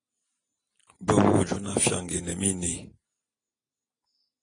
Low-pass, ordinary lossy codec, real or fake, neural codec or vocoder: 9.9 kHz; AAC, 32 kbps; real; none